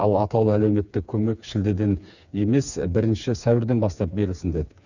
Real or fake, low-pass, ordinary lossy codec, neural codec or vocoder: fake; 7.2 kHz; none; codec, 16 kHz, 4 kbps, FreqCodec, smaller model